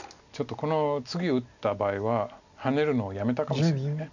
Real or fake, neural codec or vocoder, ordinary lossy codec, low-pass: real; none; none; 7.2 kHz